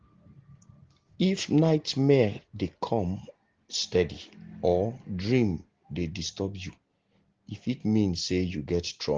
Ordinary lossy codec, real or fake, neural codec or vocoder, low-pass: Opus, 16 kbps; real; none; 7.2 kHz